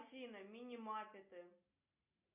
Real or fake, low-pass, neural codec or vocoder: real; 3.6 kHz; none